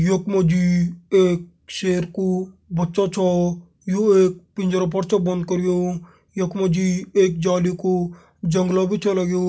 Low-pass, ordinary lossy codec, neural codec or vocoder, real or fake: none; none; none; real